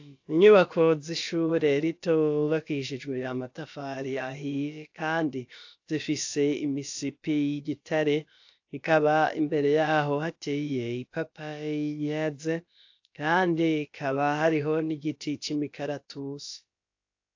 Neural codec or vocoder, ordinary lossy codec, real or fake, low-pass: codec, 16 kHz, about 1 kbps, DyCAST, with the encoder's durations; AAC, 48 kbps; fake; 7.2 kHz